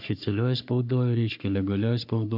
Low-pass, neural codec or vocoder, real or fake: 5.4 kHz; codec, 44.1 kHz, 3.4 kbps, Pupu-Codec; fake